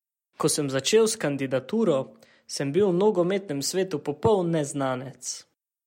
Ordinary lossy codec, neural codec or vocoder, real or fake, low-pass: MP3, 64 kbps; none; real; 19.8 kHz